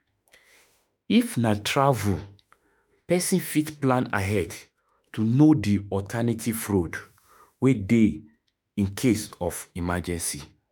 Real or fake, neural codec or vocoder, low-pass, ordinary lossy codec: fake; autoencoder, 48 kHz, 32 numbers a frame, DAC-VAE, trained on Japanese speech; none; none